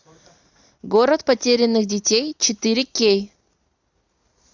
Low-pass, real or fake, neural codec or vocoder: 7.2 kHz; real; none